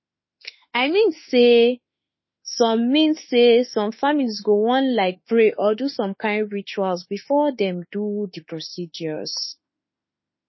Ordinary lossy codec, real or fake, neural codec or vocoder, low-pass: MP3, 24 kbps; fake; autoencoder, 48 kHz, 32 numbers a frame, DAC-VAE, trained on Japanese speech; 7.2 kHz